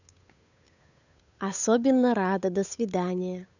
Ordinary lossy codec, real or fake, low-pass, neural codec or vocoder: none; fake; 7.2 kHz; codec, 16 kHz, 8 kbps, FunCodec, trained on Chinese and English, 25 frames a second